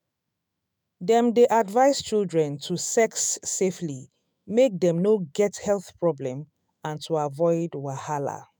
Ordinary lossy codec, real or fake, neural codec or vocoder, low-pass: none; fake; autoencoder, 48 kHz, 128 numbers a frame, DAC-VAE, trained on Japanese speech; none